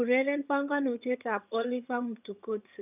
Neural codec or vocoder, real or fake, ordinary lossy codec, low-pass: vocoder, 22.05 kHz, 80 mel bands, HiFi-GAN; fake; none; 3.6 kHz